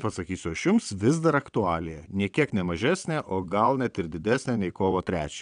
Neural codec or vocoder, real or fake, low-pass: vocoder, 22.05 kHz, 80 mel bands, WaveNeXt; fake; 9.9 kHz